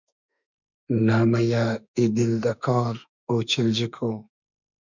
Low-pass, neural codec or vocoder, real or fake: 7.2 kHz; autoencoder, 48 kHz, 32 numbers a frame, DAC-VAE, trained on Japanese speech; fake